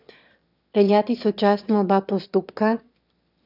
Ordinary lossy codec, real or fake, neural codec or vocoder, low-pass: none; fake; autoencoder, 22.05 kHz, a latent of 192 numbers a frame, VITS, trained on one speaker; 5.4 kHz